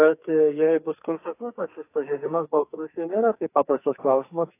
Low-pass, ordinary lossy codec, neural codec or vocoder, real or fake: 3.6 kHz; AAC, 24 kbps; codec, 44.1 kHz, 2.6 kbps, SNAC; fake